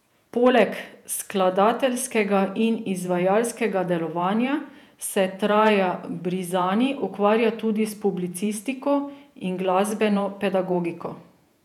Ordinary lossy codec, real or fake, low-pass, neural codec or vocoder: none; fake; 19.8 kHz; vocoder, 48 kHz, 128 mel bands, Vocos